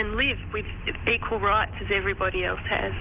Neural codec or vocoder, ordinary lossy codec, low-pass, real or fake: none; Opus, 32 kbps; 3.6 kHz; real